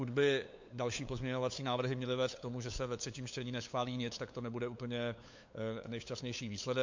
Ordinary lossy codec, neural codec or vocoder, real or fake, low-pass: MP3, 48 kbps; codec, 16 kHz, 8 kbps, FunCodec, trained on LibriTTS, 25 frames a second; fake; 7.2 kHz